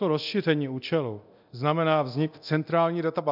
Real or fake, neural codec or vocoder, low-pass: fake; codec, 24 kHz, 0.9 kbps, DualCodec; 5.4 kHz